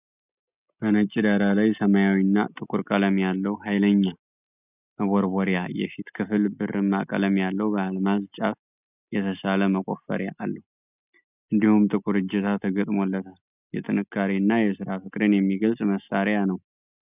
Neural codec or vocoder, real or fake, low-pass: none; real; 3.6 kHz